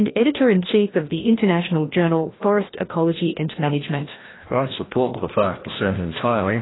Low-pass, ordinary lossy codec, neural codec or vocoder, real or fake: 7.2 kHz; AAC, 16 kbps; codec, 16 kHz, 1 kbps, FreqCodec, larger model; fake